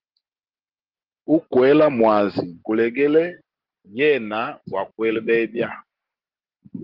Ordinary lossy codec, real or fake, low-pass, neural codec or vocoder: Opus, 16 kbps; real; 5.4 kHz; none